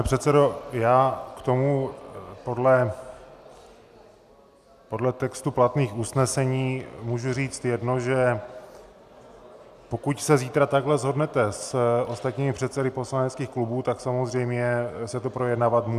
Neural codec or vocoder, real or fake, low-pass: none; real; 14.4 kHz